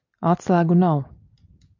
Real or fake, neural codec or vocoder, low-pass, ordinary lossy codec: real; none; 7.2 kHz; MP3, 64 kbps